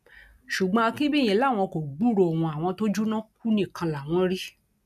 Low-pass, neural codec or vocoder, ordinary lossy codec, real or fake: 14.4 kHz; none; none; real